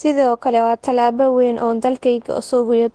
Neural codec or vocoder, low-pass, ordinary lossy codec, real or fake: codec, 24 kHz, 0.9 kbps, WavTokenizer, large speech release; 10.8 kHz; Opus, 16 kbps; fake